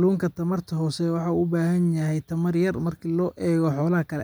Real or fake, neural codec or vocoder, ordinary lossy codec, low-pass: real; none; none; none